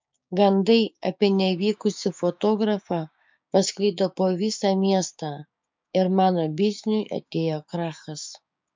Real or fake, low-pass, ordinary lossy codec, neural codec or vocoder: fake; 7.2 kHz; MP3, 64 kbps; codec, 16 kHz, 6 kbps, DAC